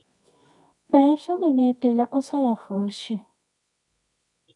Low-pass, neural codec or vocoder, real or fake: 10.8 kHz; codec, 24 kHz, 0.9 kbps, WavTokenizer, medium music audio release; fake